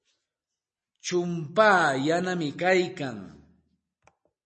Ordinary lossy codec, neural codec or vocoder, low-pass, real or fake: MP3, 32 kbps; none; 10.8 kHz; real